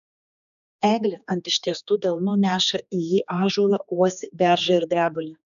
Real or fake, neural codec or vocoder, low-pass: fake; codec, 16 kHz, 2 kbps, X-Codec, HuBERT features, trained on general audio; 7.2 kHz